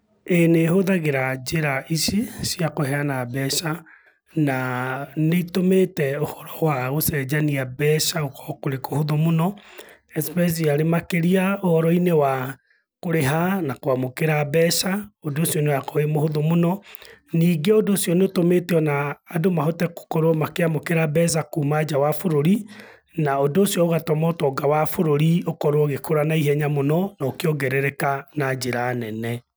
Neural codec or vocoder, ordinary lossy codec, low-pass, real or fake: none; none; none; real